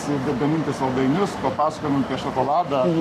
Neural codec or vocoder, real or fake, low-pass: codec, 44.1 kHz, 7.8 kbps, Pupu-Codec; fake; 14.4 kHz